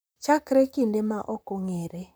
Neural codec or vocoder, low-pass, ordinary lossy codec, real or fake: vocoder, 44.1 kHz, 128 mel bands, Pupu-Vocoder; none; none; fake